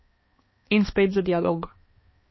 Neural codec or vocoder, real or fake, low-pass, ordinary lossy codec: codec, 16 kHz, 2 kbps, X-Codec, HuBERT features, trained on balanced general audio; fake; 7.2 kHz; MP3, 24 kbps